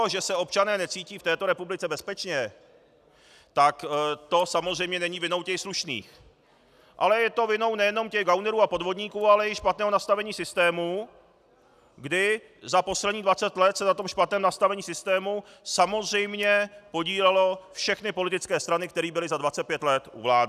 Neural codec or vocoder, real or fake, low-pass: none; real; 14.4 kHz